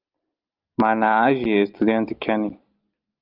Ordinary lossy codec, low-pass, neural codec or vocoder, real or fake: Opus, 32 kbps; 5.4 kHz; none; real